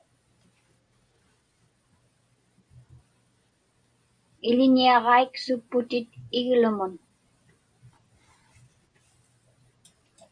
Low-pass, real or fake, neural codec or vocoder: 9.9 kHz; real; none